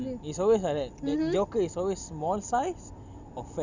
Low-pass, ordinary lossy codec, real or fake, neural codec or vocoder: 7.2 kHz; none; real; none